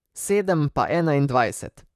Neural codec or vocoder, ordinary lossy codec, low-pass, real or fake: vocoder, 44.1 kHz, 128 mel bands, Pupu-Vocoder; none; 14.4 kHz; fake